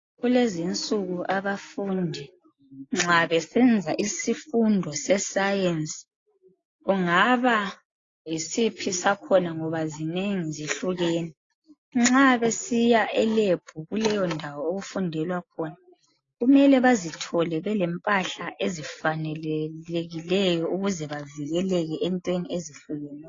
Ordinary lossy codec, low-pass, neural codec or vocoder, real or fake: AAC, 32 kbps; 7.2 kHz; none; real